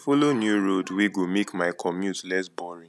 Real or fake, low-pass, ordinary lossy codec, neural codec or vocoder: real; none; none; none